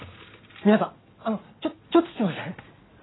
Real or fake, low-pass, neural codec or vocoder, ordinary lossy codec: real; 7.2 kHz; none; AAC, 16 kbps